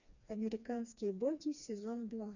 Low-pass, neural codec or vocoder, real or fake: 7.2 kHz; codec, 16 kHz, 2 kbps, FreqCodec, smaller model; fake